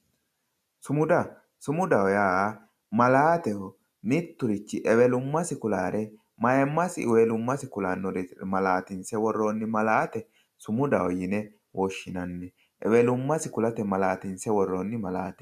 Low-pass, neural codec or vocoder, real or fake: 14.4 kHz; none; real